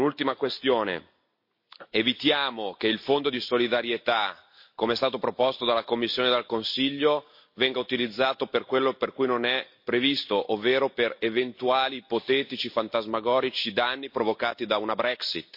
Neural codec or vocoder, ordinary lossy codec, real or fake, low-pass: none; MP3, 32 kbps; real; 5.4 kHz